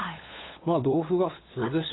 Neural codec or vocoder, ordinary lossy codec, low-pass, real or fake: codec, 16 kHz, 8 kbps, FunCodec, trained on LibriTTS, 25 frames a second; AAC, 16 kbps; 7.2 kHz; fake